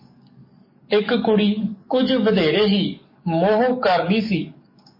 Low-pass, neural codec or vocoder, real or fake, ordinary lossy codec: 5.4 kHz; codec, 44.1 kHz, 7.8 kbps, DAC; fake; MP3, 24 kbps